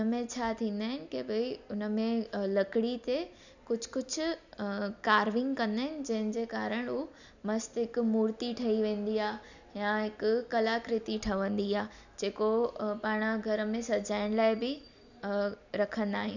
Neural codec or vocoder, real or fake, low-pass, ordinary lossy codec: none; real; 7.2 kHz; none